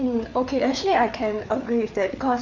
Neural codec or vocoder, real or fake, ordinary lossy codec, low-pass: codec, 16 kHz, 4 kbps, FreqCodec, larger model; fake; none; 7.2 kHz